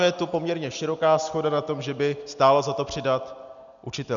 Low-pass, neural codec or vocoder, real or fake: 7.2 kHz; none; real